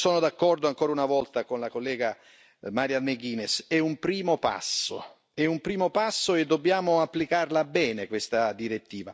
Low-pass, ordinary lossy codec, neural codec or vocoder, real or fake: none; none; none; real